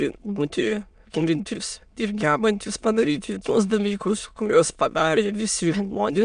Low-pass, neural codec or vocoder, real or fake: 9.9 kHz; autoencoder, 22.05 kHz, a latent of 192 numbers a frame, VITS, trained on many speakers; fake